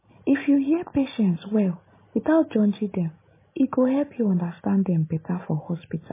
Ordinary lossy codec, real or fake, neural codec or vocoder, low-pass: MP3, 16 kbps; real; none; 3.6 kHz